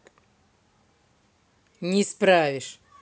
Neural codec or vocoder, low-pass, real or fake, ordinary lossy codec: none; none; real; none